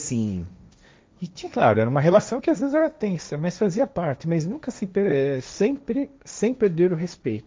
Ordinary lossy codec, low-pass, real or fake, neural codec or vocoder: none; none; fake; codec, 16 kHz, 1.1 kbps, Voila-Tokenizer